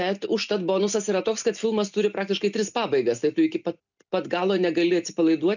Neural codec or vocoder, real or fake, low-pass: none; real; 7.2 kHz